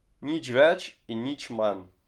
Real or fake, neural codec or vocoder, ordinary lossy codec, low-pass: fake; codec, 44.1 kHz, 7.8 kbps, Pupu-Codec; Opus, 32 kbps; 19.8 kHz